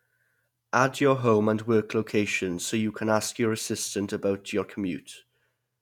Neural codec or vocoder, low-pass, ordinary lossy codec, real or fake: vocoder, 48 kHz, 128 mel bands, Vocos; 19.8 kHz; none; fake